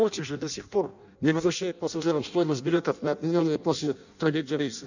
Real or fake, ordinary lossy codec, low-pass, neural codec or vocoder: fake; none; 7.2 kHz; codec, 16 kHz in and 24 kHz out, 0.6 kbps, FireRedTTS-2 codec